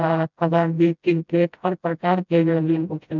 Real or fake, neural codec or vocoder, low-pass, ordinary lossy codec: fake; codec, 16 kHz, 0.5 kbps, FreqCodec, smaller model; 7.2 kHz; none